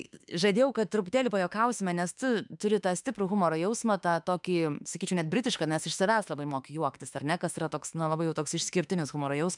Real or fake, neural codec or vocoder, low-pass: fake; autoencoder, 48 kHz, 32 numbers a frame, DAC-VAE, trained on Japanese speech; 10.8 kHz